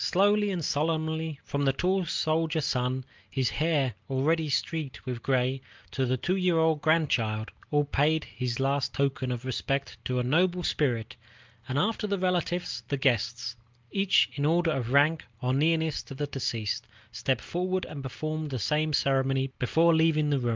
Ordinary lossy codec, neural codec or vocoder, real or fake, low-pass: Opus, 32 kbps; none; real; 7.2 kHz